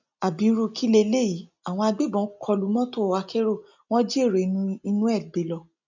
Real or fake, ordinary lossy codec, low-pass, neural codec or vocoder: real; none; 7.2 kHz; none